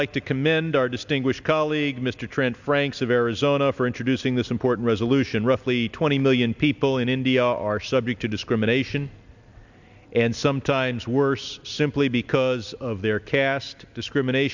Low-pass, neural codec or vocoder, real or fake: 7.2 kHz; none; real